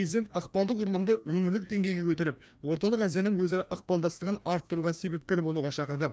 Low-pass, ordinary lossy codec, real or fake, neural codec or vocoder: none; none; fake; codec, 16 kHz, 1 kbps, FreqCodec, larger model